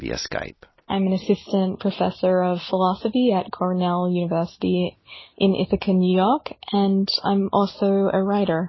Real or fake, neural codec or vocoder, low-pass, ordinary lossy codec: real; none; 7.2 kHz; MP3, 24 kbps